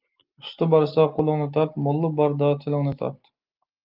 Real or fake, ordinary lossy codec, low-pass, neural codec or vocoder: real; Opus, 32 kbps; 5.4 kHz; none